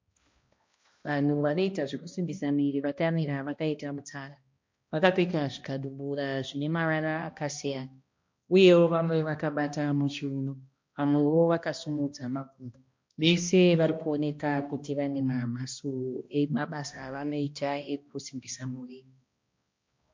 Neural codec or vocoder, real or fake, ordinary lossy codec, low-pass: codec, 16 kHz, 1 kbps, X-Codec, HuBERT features, trained on balanced general audio; fake; MP3, 48 kbps; 7.2 kHz